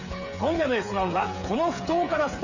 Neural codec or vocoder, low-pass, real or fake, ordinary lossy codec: codec, 16 kHz, 8 kbps, FreqCodec, smaller model; 7.2 kHz; fake; none